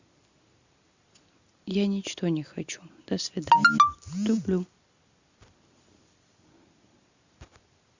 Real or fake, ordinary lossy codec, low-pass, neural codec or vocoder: real; Opus, 64 kbps; 7.2 kHz; none